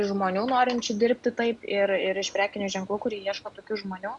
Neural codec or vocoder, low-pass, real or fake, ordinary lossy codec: none; 10.8 kHz; real; MP3, 96 kbps